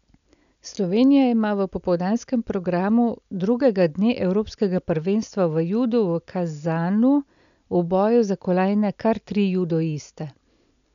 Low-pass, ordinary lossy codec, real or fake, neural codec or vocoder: 7.2 kHz; none; real; none